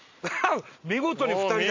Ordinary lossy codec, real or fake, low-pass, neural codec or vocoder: MP3, 48 kbps; real; 7.2 kHz; none